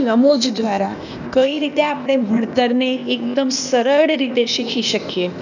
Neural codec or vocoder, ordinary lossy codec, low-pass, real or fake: codec, 16 kHz, 0.8 kbps, ZipCodec; none; 7.2 kHz; fake